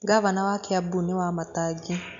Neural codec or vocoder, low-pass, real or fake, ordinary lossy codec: none; 7.2 kHz; real; none